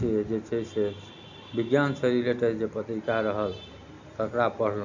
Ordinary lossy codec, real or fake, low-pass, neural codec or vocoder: none; real; 7.2 kHz; none